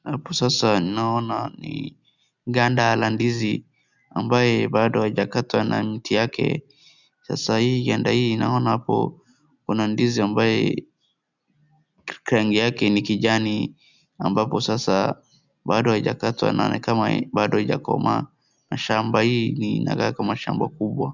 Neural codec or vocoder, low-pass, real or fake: none; 7.2 kHz; real